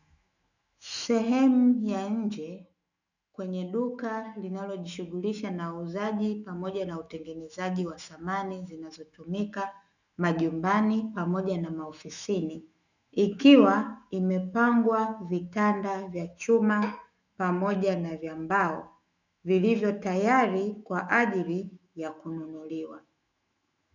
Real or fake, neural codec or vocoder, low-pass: fake; autoencoder, 48 kHz, 128 numbers a frame, DAC-VAE, trained on Japanese speech; 7.2 kHz